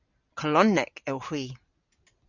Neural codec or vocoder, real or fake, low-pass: none; real; 7.2 kHz